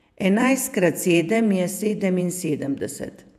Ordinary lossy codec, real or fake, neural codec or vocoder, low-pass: none; fake; vocoder, 48 kHz, 128 mel bands, Vocos; 14.4 kHz